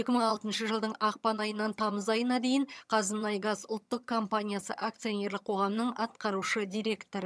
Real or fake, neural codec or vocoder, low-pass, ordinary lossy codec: fake; vocoder, 22.05 kHz, 80 mel bands, HiFi-GAN; none; none